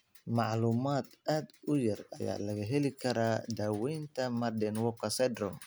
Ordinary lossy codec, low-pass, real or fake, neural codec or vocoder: none; none; real; none